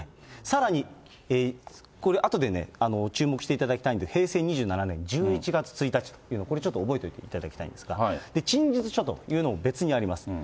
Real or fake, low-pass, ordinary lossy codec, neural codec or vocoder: real; none; none; none